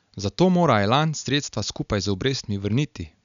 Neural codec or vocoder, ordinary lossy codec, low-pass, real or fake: none; none; 7.2 kHz; real